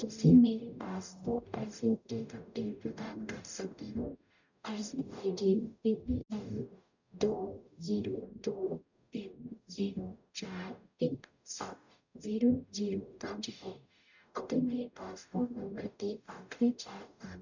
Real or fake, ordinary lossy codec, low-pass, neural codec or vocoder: fake; none; 7.2 kHz; codec, 44.1 kHz, 0.9 kbps, DAC